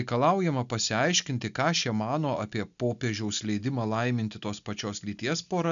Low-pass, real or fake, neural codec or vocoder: 7.2 kHz; real; none